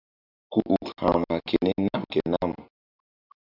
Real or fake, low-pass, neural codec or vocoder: real; 5.4 kHz; none